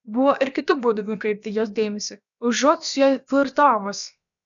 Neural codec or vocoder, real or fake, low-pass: codec, 16 kHz, about 1 kbps, DyCAST, with the encoder's durations; fake; 7.2 kHz